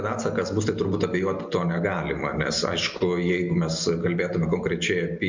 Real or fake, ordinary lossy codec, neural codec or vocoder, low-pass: real; MP3, 64 kbps; none; 7.2 kHz